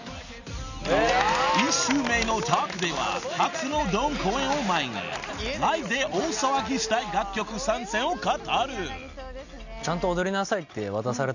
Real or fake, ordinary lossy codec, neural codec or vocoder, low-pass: real; none; none; 7.2 kHz